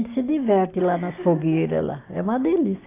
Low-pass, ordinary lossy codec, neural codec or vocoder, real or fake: 3.6 kHz; AAC, 24 kbps; none; real